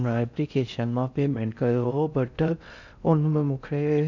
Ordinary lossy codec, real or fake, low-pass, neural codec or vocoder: none; fake; 7.2 kHz; codec, 16 kHz in and 24 kHz out, 0.6 kbps, FocalCodec, streaming, 4096 codes